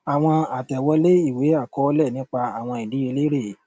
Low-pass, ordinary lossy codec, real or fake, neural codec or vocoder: none; none; real; none